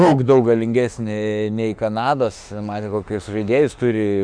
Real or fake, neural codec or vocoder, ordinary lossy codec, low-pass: fake; autoencoder, 48 kHz, 32 numbers a frame, DAC-VAE, trained on Japanese speech; Opus, 64 kbps; 9.9 kHz